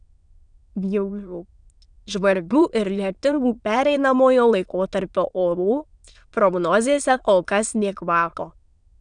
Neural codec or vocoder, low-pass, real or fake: autoencoder, 22.05 kHz, a latent of 192 numbers a frame, VITS, trained on many speakers; 9.9 kHz; fake